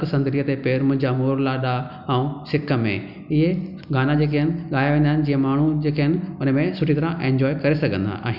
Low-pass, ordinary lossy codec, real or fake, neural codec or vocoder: 5.4 kHz; none; real; none